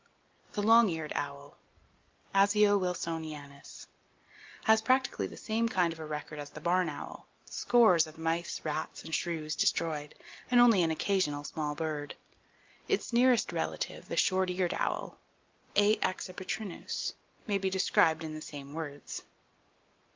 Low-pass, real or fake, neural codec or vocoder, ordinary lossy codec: 7.2 kHz; real; none; Opus, 32 kbps